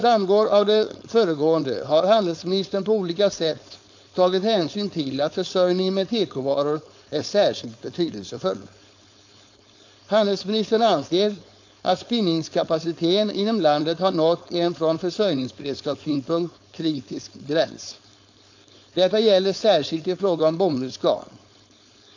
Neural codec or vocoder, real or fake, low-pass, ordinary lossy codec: codec, 16 kHz, 4.8 kbps, FACodec; fake; 7.2 kHz; AAC, 48 kbps